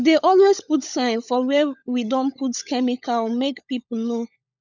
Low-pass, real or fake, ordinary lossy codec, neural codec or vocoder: 7.2 kHz; fake; none; codec, 16 kHz, 16 kbps, FunCodec, trained on LibriTTS, 50 frames a second